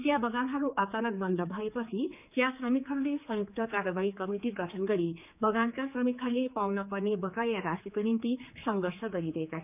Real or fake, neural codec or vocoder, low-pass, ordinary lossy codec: fake; codec, 16 kHz, 4 kbps, X-Codec, HuBERT features, trained on general audio; 3.6 kHz; none